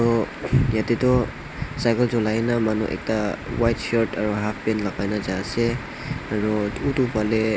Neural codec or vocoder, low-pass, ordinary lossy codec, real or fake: none; none; none; real